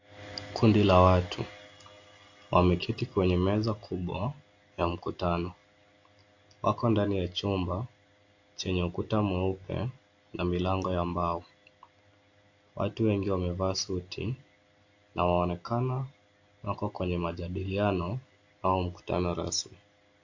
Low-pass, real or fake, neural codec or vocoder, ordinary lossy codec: 7.2 kHz; real; none; AAC, 48 kbps